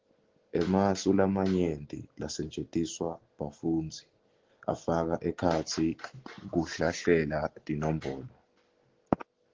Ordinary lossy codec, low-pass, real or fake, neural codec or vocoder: Opus, 16 kbps; 7.2 kHz; real; none